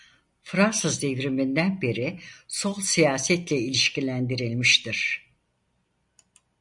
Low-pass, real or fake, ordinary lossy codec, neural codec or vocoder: 10.8 kHz; real; MP3, 64 kbps; none